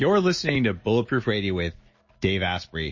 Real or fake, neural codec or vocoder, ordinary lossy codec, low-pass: real; none; MP3, 32 kbps; 7.2 kHz